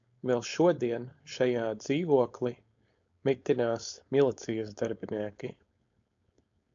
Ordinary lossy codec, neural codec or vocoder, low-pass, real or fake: AAC, 64 kbps; codec, 16 kHz, 4.8 kbps, FACodec; 7.2 kHz; fake